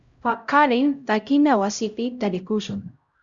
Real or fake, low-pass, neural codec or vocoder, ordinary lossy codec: fake; 7.2 kHz; codec, 16 kHz, 0.5 kbps, X-Codec, HuBERT features, trained on LibriSpeech; Opus, 64 kbps